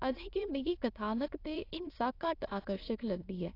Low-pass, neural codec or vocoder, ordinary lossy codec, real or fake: 5.4 kHz; autoencoder, 22.05 kHz, a latent of 192 numbers a frame, VITS, trained on many speakers; AAC, 32 kbps; fake